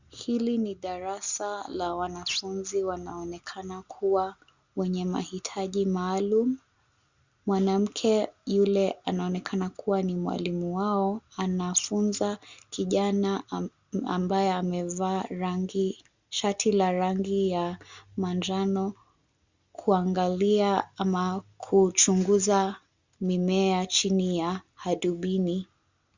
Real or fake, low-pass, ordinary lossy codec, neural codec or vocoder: real; 7.2 kHz; Opus, 64 kbps; none